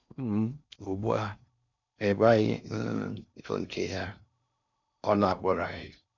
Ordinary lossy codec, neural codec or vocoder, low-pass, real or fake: none; codec, 16 kHz in and 24 kHz out, 0.6 kbps, FocalCodec, streaming, 4096 codes; 7.2 kHz; fake